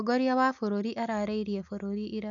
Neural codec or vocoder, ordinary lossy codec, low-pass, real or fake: none; none; 7.2 kHz; real